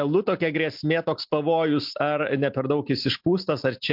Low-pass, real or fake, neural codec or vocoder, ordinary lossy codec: 5.4 kHz; real; none; MP3, 48 kbps